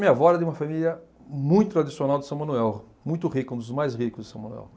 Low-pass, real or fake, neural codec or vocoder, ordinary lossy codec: none; real; none; none